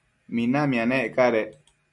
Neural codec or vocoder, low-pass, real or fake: none; 10.8 kHz; real